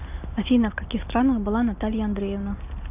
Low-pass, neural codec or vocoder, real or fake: 3.6 kHz; none; real